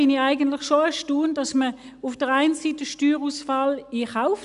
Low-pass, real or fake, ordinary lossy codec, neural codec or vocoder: 10.8 kHz; real; none; none